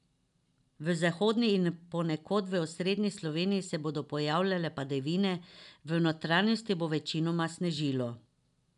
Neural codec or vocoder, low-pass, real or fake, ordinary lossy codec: none; 10.8 kHz; real; none